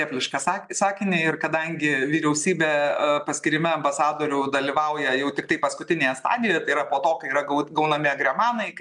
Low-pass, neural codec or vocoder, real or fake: 10.8 kHz; none; real